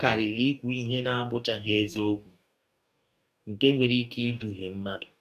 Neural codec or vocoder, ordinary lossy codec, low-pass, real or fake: codec, 44.1 kHz, 2.6 kbps, DAC; none; 14.4 kHz; fake